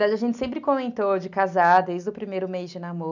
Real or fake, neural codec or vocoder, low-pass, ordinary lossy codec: real; none; 7.2 kHz; none